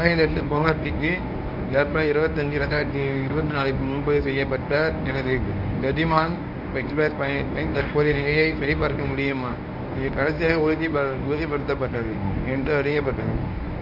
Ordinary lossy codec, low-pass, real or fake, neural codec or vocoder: none; 5.4 kHz; fake; codec, 24 kHz, 0.9 kbps, WavTokenizer, medium speech release version 1